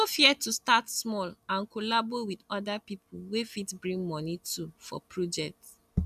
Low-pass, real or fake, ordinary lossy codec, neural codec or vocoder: 14.4 kHz; real; none; none